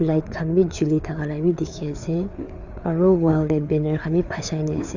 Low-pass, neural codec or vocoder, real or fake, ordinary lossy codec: 7.2 kHz; codec, 16 kHz, 4 kbps, FreqCodec, larger model; fake; none